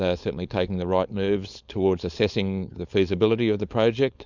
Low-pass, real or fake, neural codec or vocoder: 7.2 kHz; fake; codec, 16 kHz, 4.8 kbps, FACodec